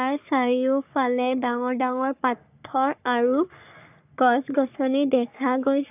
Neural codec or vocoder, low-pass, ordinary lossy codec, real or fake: codec, 44.1 kHz, 3.4 kbps, Pupu-Codec; 3.6 kHz; AAC, 32 kbps; fake